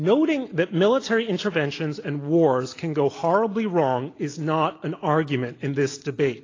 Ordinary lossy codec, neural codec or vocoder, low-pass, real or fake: AAC, 32 kbps; none; 7.2 kHz; real